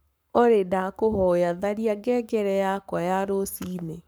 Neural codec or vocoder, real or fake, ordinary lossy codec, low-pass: codec, 44.1 kHz, 7.8 kbps, Pupu-Codec; fake; none; none